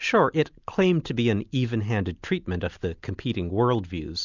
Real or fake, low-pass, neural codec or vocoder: real; 7.2 kHz; none